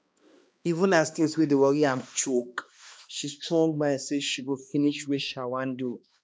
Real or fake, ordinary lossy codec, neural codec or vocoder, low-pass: fake; none; codec, 16 kHz, 2 kbps, X-Codec, HuBERT features, trained on balanced general audio; none